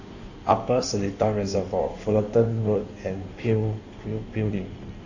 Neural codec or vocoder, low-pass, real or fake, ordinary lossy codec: codec, 16 kHz in and 24 kHz out, 1.1 kbps, FireRedTTS-2 codec; 7.2 kHz; fake; none